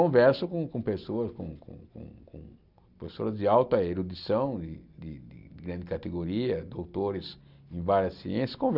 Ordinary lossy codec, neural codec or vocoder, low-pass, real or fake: none; none; 5.4 kHz; real